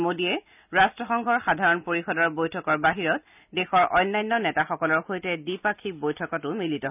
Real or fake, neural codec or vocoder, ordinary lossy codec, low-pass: real; none; none; 3.6 kHz